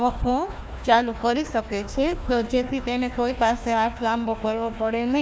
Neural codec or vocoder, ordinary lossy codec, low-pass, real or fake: codec, 16 kHz, 1 kbps, FunCodec, trained on Chinese and English, 50 frames a second; none; none; fake